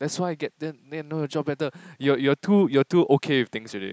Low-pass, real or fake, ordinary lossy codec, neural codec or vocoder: none; real; none; none